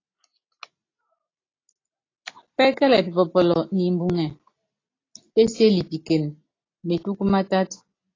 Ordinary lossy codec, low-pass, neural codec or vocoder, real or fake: AAC, 32 kbps; 7.2 kHz; none; real